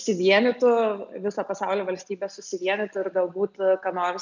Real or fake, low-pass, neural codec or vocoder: real; 7.2 kHz; none